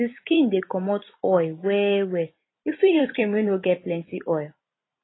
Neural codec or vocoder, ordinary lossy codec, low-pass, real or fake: vocoder, 44.1 kHz, 128 mel bands every 256 samples, BigVGAN v2; AAC, 16 kbps; 7.2 kHz; fake